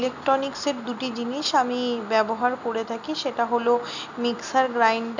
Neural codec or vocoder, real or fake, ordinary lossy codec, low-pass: none; real; none; 7.2 kHz